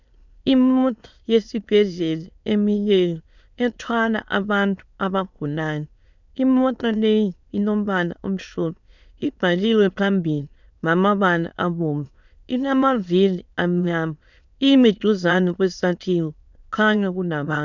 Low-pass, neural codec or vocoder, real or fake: 7.2 kHz; autoencoder, 22.05 kHz, a latent of 192 numbers a frame, VITS, trained on many speakers; fake